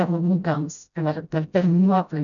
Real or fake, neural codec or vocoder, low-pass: fake; codec, 16 kHz, 0.5 kbps, FreqCodec, smaller model; 7.2 kHz